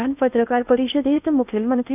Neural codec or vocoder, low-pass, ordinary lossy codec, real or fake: codec, 16 kHz in and 24 kHz out, 0.6 kbps, FocalCodec, streaming, 2048 codes; 3.6 kHz; none; fake